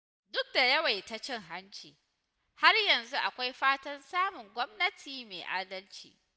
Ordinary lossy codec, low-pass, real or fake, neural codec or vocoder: none; none; real; none